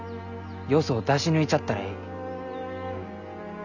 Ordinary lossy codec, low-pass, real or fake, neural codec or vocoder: none; 7.2 kHz; real; none